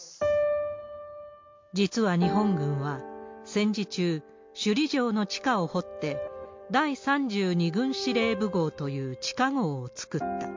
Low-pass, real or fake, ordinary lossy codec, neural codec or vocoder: 7.2 kHz; real; MP3, 48 kbps; none